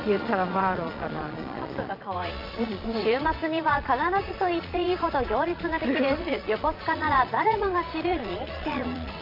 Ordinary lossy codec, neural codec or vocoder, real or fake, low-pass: AAC, 48 kbps; vocoder, 22.05 kHz, 80 mel bands, Vocos; fake; 5.4 kHz